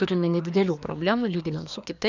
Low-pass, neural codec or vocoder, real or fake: 7.2 kHz; codec, 24 kHz, 1 kbps, SNAC; fake